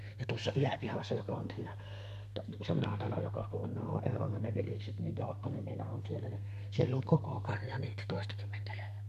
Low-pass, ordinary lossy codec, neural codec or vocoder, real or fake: 14.4 kHz; none; codec, 32 kHz, 1.9 kbps, SNAC; fake